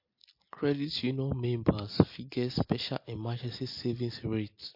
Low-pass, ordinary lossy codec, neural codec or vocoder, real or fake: 5.4 kHz; MP3, 32 kbps; none; real